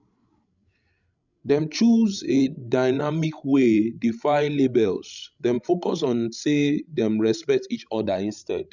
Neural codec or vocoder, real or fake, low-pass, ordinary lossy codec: codec, 16 kHz, 16 kbps, FreqCodec, larger model; fake; 7.2 kHz; none